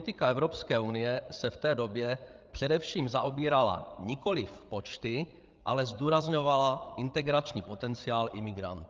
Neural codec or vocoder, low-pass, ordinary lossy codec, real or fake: codec, 16 kHz, 8 kbps, FreqCodec, larger model; 7.2 kHz; Opus, 24 kbps; fake